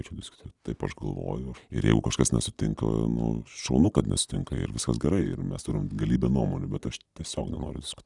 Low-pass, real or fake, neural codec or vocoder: 10.8 kHz; real; none